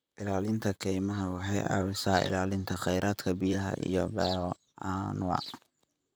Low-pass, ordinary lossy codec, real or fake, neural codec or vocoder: none; none; fake; vocoder, 44.1 kHz, 128 mel bands, Pupu-Vocoder